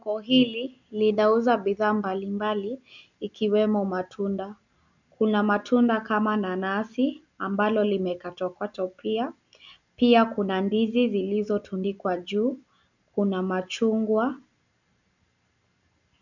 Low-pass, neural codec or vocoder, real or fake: 7.2 kHz; none; real